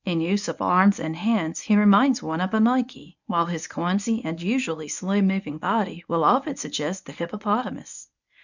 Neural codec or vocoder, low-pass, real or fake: codec, 24 kHz, 0.9 kbps, WavTokenizer, medium speech release version 1; 7.2 kHz; fake